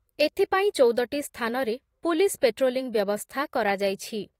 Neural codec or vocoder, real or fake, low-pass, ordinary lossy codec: vocoder, 44.1 kHz, 128 mel bands every 512 samples, BigVGAN v2; fake; 19.8 kHz; AAC, 48 kbps